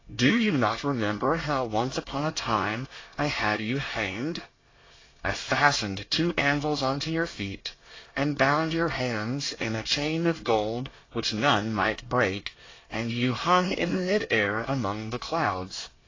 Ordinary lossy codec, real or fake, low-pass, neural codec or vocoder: AAC, 32 kbps; fake; 7.2 kHz; codec, 24 kHz, 1 kbps, SNAC